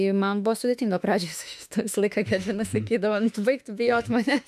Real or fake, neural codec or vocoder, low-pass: fake; autoencoder, 48 kHz, 32 numbers a frame, DAC-VAE, trained on Japanese speech; 14.4 kHz